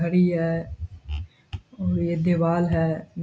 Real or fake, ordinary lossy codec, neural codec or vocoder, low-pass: real; none; none; none